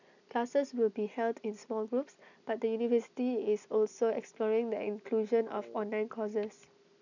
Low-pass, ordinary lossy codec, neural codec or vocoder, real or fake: 7.2 kHz; none; none; real